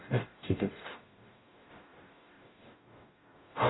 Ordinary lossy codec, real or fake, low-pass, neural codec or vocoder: AAC, 16 kbps; fake; 7.2 kHz; codec, 44.1 kHz, 0.9 kbps, DAC